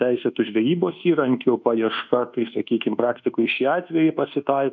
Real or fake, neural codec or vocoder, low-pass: fake; codec, 24 kHz, 1.2 kbps, DualCodec; 7.2 kHz